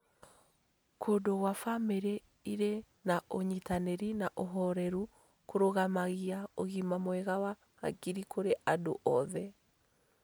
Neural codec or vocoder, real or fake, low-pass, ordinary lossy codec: none; real; none; none